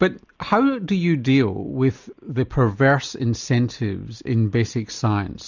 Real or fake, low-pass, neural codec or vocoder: real; 7.2 kHz; none